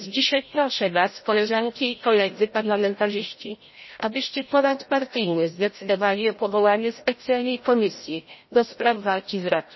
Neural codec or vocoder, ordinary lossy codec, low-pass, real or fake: codec, 16 kHz, 0.5 kbps, FreqCodec, larger model; MP3, 24 kbps; 7.2 kHz; fake